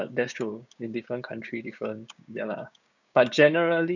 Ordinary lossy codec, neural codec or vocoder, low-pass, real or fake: none; codec, 16 kHz, 6 kbps, DAC; 7.2 kHz; fake